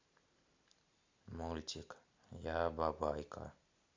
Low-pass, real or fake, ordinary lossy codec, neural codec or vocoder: 7.2 kHz; real; MP3, 64 kbps; none